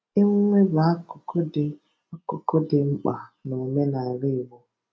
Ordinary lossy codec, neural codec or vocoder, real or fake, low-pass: none; none; real; none